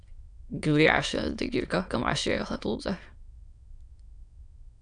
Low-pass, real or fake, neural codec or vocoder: 9.9 kHz; fake; autoencoder, 22.05 kHz, a latent of 192 numbers a frame, VITS, trained on many speakers